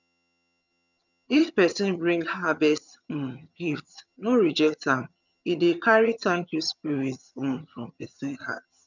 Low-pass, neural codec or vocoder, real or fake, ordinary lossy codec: 7.2 kHz; vocoder, 22.05 kHz, 80 mel bands, HiFi-GAN; fake; none